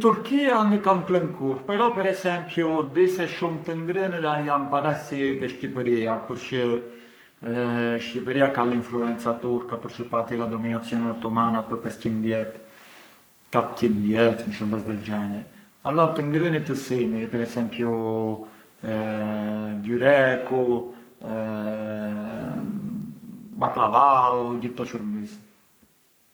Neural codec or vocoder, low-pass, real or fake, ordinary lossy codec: codec, 44.1 kHz, 3.4 kbps, Pupu-Codec; none; fake; none